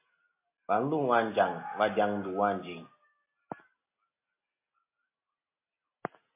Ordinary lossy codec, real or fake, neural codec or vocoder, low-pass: MP3, 24 kbps; real; none; 3.6 kHz